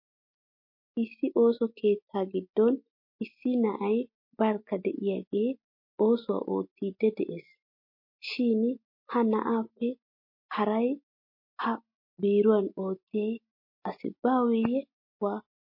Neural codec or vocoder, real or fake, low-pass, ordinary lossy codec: none; real; 5.4 kHz; MP3, 32 kbps